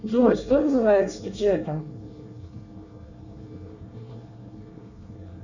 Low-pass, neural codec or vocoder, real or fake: 7.2 kHz; codec, 24 kHz, 1 kbps, SNAC; fake